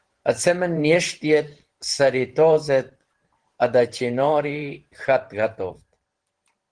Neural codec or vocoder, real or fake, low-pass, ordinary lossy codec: vocoder, 44.1 kHz, 128 mel bands every 512 samples, BigVGAN v2; fake; 9.9 kHz; Opus, 16 kbps